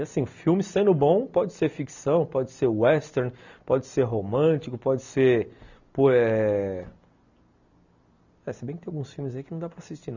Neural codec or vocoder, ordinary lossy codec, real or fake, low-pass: none; none; real; 7.2 kHz